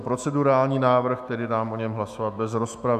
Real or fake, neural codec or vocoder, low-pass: fake; autoencoder, 48 kHz, 128 numbers a frame, DAC-VAE, trained on Japanese speech; 14.4 kHz